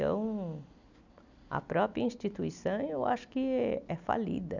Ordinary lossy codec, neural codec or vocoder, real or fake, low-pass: none; none; real; 7.2 kHz